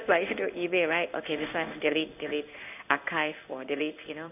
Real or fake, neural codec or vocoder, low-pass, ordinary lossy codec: fake; codec, 16 kHz in and 24 kHz out, 1 kbps, XY-Tokenizer; 3.6 kHz; none